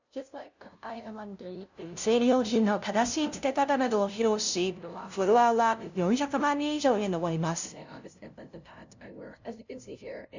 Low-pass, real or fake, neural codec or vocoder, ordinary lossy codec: 7.2 kHz; fake; codec, 16 kHz, 0.5 kbps, FunCodec, trained on LibriTTS, 25 frames a second; none